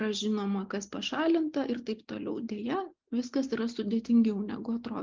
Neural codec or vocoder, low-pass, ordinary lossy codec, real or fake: none; 7.2 kHz; Opus, 32 kbps; real